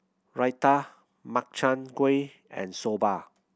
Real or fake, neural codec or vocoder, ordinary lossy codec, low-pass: real; none; none; none